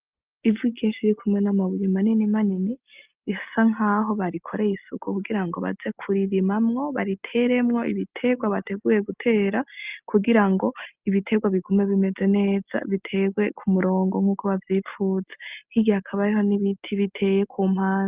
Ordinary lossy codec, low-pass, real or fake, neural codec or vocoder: Opus, 32 kbps; 3.6 kHz; real; none